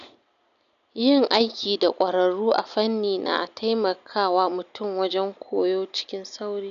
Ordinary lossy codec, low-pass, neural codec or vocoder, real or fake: none; 7.2 kHz; none; real